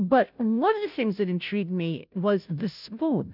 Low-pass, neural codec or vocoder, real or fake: 5.4 kHz; codec, 16 kHz, 0.5 kbps, FunCodec, trained on Chinese and English, 25 frames a second; fake